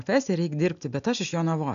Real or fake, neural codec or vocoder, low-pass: real; none; 7.2 kHz